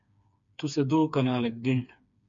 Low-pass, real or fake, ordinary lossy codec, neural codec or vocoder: 7.2 kHz; fake; AAC, 64 kbps; codec, 16 kHz, 4 kbps, FreqCodec, smaller model